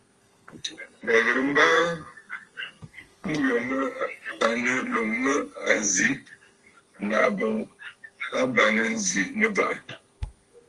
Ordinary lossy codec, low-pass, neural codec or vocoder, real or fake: Opus, 24 kbps; 10.8 kHz; codec, 32 kHz, 1.9 kbps, SNAC; fake